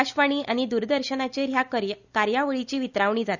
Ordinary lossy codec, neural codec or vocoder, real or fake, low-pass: none; none; real; 7.2 kHz